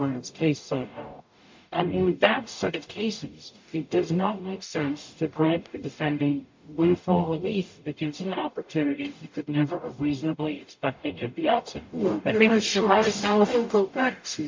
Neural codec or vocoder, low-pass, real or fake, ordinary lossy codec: codec, 44.1 kHz, 0.9 kbps, DAC; 7.2 kHz; fake; MP3, 48 kbps